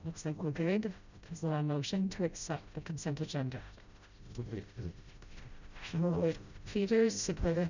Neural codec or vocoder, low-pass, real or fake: codec, 16 kHz, 0.5 kbps, FreqCodec, smaller model; 7.2 kHz; fake